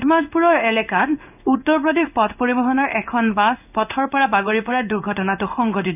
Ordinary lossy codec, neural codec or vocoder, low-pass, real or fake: none; codec, 16 kHz in and 24 kHz out, 1 kbps, XY-Tokenizer; 3.6 kHz; fake